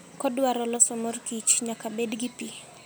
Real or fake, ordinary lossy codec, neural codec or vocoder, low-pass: real; none; none; none